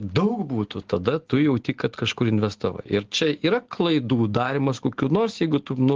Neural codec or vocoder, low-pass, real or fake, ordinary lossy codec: none; 7.2 kHz; real; Opus, 16 kbps